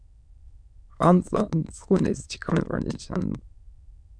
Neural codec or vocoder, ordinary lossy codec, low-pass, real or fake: autoencoder, 22.05 kHz, a latent of 192 numbers a frame, VITS, trained on many speakers; MP3, 96 kbps; 9.9 kHz; fake